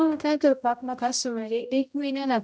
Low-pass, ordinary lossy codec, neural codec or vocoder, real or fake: none; none; codec, 16 kHz, 0.5 kbps, X-Codec, HuBERT features, trained on general audio; fake